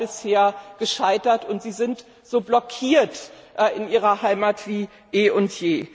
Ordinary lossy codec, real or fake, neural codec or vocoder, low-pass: none; real; none; none